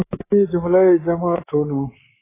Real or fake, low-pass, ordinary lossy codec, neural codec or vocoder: fake; 3.6 kHz; AAC, 16 kbps; codec, 24 kHz, 3.1 kbps, DualCodec